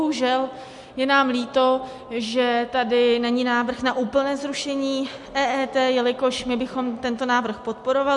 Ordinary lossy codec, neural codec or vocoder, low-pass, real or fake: MP3, 64 kbps; none; 10.8 kHz; real